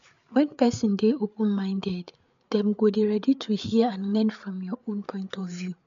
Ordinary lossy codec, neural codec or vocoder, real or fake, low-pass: MP3, 64 kbps; codec, 16 kHz, 8 kbps, FreqCodec, larger model; fake; 7.2 kHz